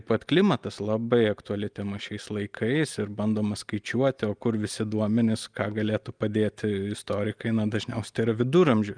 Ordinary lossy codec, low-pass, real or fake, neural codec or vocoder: Opus, 32 kbps; 9.9 kHz; real; none